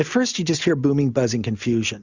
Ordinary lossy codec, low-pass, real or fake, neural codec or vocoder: Opus, 64 kbps; 7.2 kHz; real; none